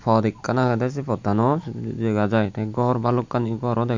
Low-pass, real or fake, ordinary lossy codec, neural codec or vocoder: 7.2 kHz; real; MP3, 64 kbps; none